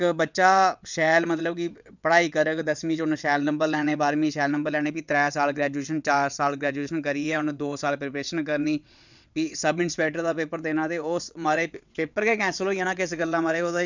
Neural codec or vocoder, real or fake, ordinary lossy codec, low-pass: vocoder, 22.05 kHz, 80 mel bands, WaveNeXt; fake; none; 7.2 kHz